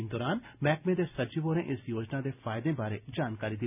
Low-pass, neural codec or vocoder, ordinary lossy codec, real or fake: 3.6 kHz; none; none; real